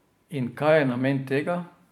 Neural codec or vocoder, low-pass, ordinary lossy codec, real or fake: vocoder, 44.1 kHz, 128 mel bands every 512 samples, BigVGAN v2; 19.8 kHz; none; fake